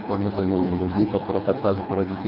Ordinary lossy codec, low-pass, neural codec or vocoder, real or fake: none; 5.4 kHz; codec, 24 kHz, 1.5 kbps, HILCodec; fake